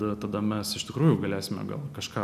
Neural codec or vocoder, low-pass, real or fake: none; 14.4 kHz; real